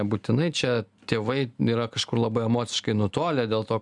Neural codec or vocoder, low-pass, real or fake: none; 10.8 kHz; real